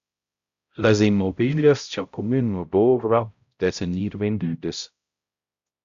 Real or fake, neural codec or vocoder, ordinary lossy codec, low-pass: fake; codec, 16 kHz, 0.5 kbps, X-Codec, HuBERT features, trained on balanced general audio; Opus, 64 kbps; 7.2 kHz